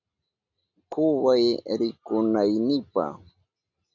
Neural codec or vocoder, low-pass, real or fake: none; 7.2 kHz; real